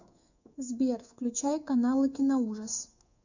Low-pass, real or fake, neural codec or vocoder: 7.2 kHz; fake; codec, 24 kHz, 3.1 kbps, DualCodec